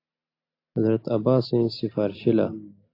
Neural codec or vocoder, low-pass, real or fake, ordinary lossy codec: none; 5.4 kHz; real; AAC, 32 kbps